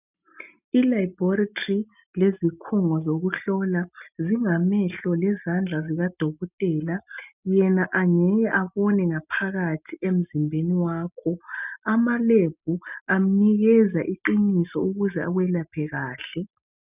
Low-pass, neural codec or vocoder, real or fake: 3.6 kHz; none; real